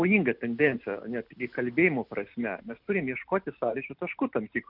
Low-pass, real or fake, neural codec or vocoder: 5.4 kHz; real; none